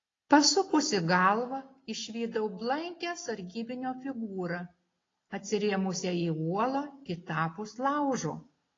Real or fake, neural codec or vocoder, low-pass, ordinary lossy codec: real; none; 7.2 kHz; AAC, 32 kbps